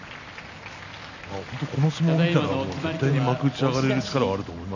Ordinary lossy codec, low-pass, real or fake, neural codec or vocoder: none; 7.2 kHz; real; none